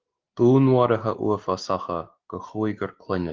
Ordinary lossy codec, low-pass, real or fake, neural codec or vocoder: Opus, 16 kbps; 7.2 kHz; real; none